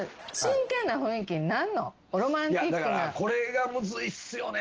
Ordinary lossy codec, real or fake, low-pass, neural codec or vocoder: Opus, 16 kbps; real; 7.2 kHz; none